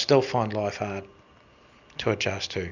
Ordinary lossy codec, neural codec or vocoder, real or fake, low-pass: Opus, 64 kbps; none; real; 7.2 kHz